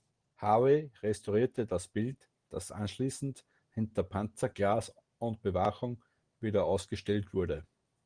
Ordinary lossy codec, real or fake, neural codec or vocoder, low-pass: Opus, 24 kbps; real; none; 9.9 kHz